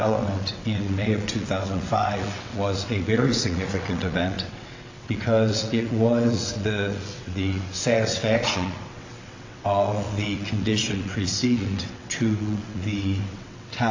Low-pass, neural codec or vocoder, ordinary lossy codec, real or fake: 7.2 kHz; vocoder, 22.05 kHz, 80 mel bands, WaveNeXt; AAC, 48 kbps; fake